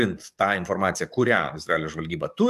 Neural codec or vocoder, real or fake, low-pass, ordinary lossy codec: codec, 44.1 kHz, 7.8 kbps, DAC; fake; 14.4 kHz; AAC, 96 kbps